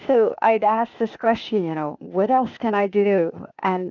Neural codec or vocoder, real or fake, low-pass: codec, 16 kHz, 0.8 kbps, ZipCodec; fake; 7.2 kHz